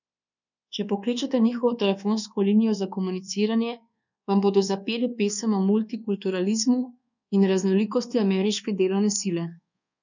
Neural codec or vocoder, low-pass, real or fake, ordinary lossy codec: codec, 24 kHz, 1.2 kbps, DualCodec; 7.2 kHz; fake; none